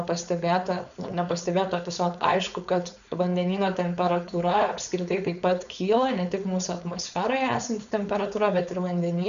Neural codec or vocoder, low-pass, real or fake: codec, 16 kHz, 4.8 kbps, FACodec; 7.2 kHz; fake